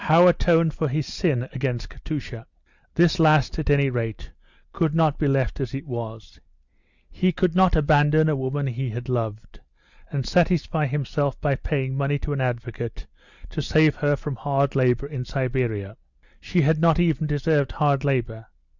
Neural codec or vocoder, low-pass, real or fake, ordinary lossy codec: none; 7.2 kHz; real; Opus, 64 kbps